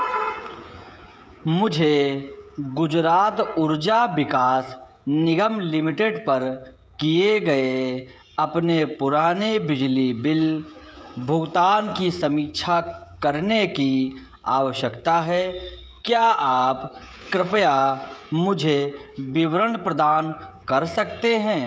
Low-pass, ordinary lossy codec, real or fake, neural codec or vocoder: none; none; fake; codec, 16 kHz, 16 kbps, FreqCodec, smaller model